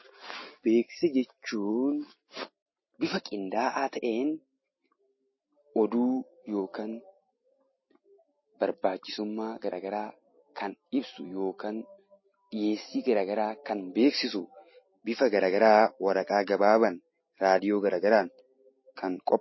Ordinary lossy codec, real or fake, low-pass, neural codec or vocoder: MP3, 24 kbps; real; 7.2 kHz; none